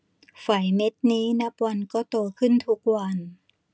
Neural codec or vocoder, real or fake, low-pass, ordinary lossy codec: none; real; none; none